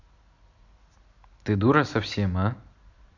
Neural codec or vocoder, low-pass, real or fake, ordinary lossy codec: none; 7.2 kHz; real; none